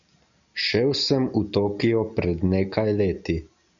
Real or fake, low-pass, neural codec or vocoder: real; 7.2 kHz; none